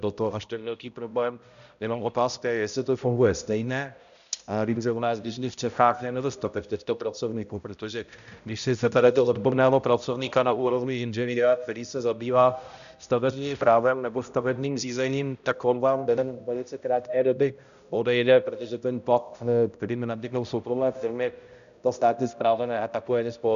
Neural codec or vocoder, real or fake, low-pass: codec, 16 kHz, 0.5 kbps, X-Codec, HuBERT features, trained on balanced general audio; fake; 7.2 kHz